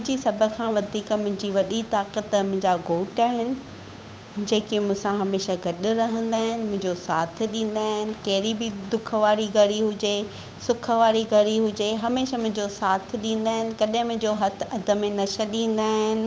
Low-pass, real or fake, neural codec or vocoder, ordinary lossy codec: none; fake; codec, 16 kHz, 8 kbps, FunCodec, trained on Chinese and English, 25 frames a second; none